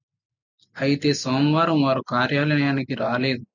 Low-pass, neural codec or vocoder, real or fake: 7.2 kHz; none; real